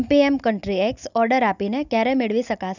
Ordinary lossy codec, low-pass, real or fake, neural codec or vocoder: none; 7.2 kHz; real; none